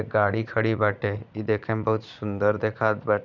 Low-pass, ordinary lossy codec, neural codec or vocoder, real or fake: 7.2 kHz; none; vocoder, 44.1 kHz, 80 mel bands, Vocos; fake